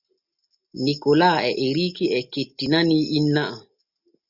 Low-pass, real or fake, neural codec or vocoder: 5.4 kHz; real; none